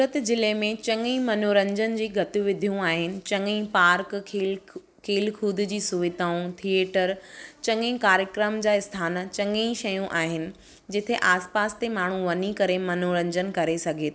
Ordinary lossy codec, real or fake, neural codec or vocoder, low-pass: none; real; none; none